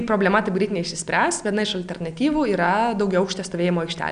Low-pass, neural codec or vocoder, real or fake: 9.9 kHz; none; real